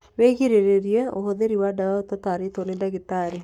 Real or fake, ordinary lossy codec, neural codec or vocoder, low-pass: fake; none; codec, 44.1 kHz, 7.8 kbps, Pupu-Codec; 19.8 kHz